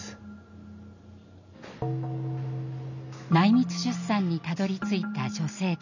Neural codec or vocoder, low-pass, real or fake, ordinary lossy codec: none; 7.2 kHz; real; none